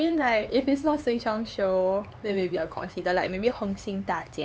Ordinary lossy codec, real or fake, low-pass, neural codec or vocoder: none; fake; none; codec, 16 kHz, 4 kbps, X-Codec, HuBERT features, trained on LibriSpeech